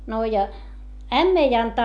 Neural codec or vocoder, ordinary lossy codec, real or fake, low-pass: none; none; real; none